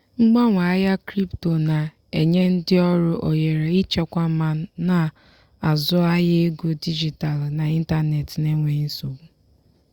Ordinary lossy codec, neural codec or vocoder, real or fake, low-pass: Opus, 64 kbps; none; real; 19.8 kHz